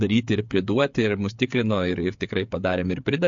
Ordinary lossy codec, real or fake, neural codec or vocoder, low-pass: MP3, 48 kbps; fake; codec, 16 kHz, 8 kbps, FreqCodec, smaller model; 7.2 kHz